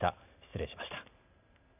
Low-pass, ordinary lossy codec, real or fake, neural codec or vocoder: 3.6 kHz; none; real; none